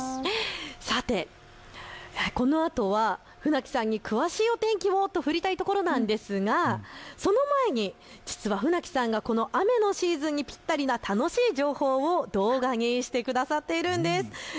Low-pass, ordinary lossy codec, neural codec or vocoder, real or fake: none; none; none; real